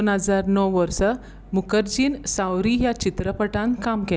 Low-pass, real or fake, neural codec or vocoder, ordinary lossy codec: none; real; none; none